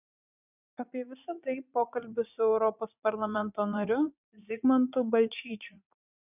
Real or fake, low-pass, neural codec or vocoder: fake; 3.6 kHz; vocoder, 44.1 kHz, 80 mel bands, Vocos